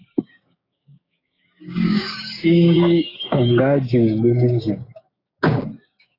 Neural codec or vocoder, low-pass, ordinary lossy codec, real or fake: codec, 16 kHz, 6 kbps, DAC; 5.4 kHz; AAC, 24 kbps; fake